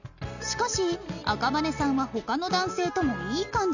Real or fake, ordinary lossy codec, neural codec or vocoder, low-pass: real; none; none; 7.2 kHz